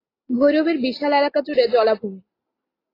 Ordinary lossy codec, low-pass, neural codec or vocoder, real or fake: AAC, 24 kbps; 5.4 kHz; none; real